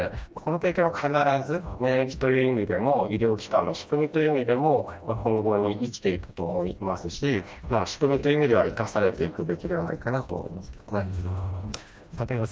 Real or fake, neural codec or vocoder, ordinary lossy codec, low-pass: fake; codec, 16 kHz, 1 kbps, FreqCodec, smaller model; none; none